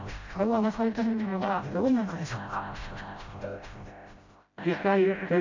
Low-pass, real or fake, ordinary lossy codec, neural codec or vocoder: 7.2 kHz; fake; MP3, 48 kbps; codec, 16 kHz, 0.5 kbps, FreqCodec, smaller model